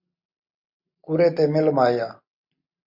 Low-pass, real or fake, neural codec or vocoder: 5.4 kHz; real; none